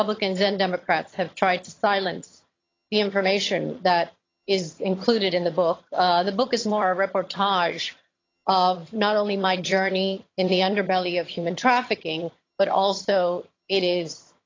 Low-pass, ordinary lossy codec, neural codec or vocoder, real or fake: 7.2 kHz; AAC, 32 kbps; vocoder, 22.05 kHz, 80 mel bands, HiFi-GAN; fake